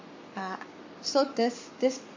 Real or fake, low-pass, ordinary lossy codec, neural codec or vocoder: fake; 7.2 kHz; MP3, 48 kbps; codec, 44.1 kHz, 7.8 kbps, Pupu-Codec